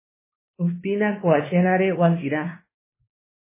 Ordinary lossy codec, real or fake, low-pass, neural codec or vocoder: MP3, 16 kbps; fake; 3.6 kHz; codec, 16 kHz, 2 kbps, X-Codec, WavLM features, trained on Multilingual LibriSpeech